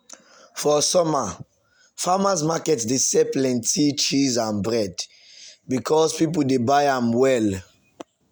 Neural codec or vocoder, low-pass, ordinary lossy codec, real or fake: none; none; none; real